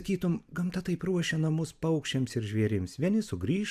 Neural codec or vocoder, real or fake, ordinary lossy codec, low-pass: none; real; AAC, 96 kbps; 14.4 kHz